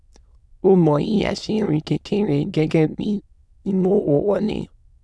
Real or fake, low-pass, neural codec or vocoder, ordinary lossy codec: fake; none; autoencoder, 22.05 kHz, a latent of 192 numbers a frame, VITS, trained on many speakers; none